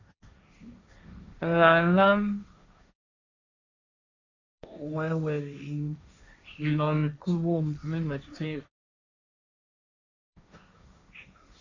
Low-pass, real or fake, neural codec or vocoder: 7.2 kHz; fake; codec, 16 kHz, 1.1 kbps, Voila-Tokenizer